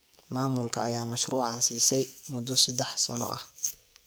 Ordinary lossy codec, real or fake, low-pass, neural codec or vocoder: none; fake; none; codec, 44.1 kHz, 2.6 kbps, SNAC